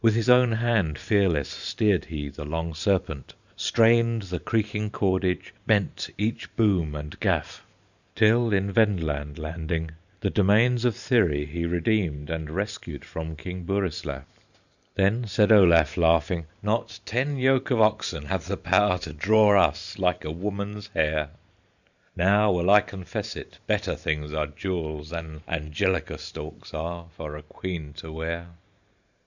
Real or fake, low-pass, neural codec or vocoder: fake; 7.2 kHz; vocoder, 44.1 kHz, 128 mel bands every 512 samples, BigVGAN v2